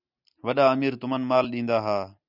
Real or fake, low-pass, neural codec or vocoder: real; 5.4 kHz; none